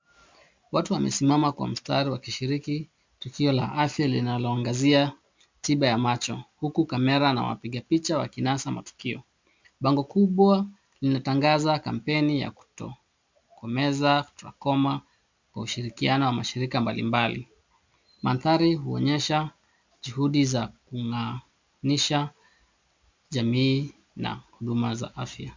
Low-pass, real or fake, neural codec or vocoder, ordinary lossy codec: 7.2 kHz; real; none; MP3, 64 kbps